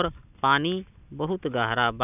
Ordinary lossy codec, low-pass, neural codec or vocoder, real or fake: none; 3.6 kHz; none; real